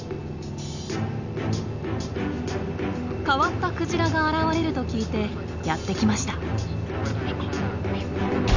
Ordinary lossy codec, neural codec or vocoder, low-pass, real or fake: none; none; 7.2 kHz; real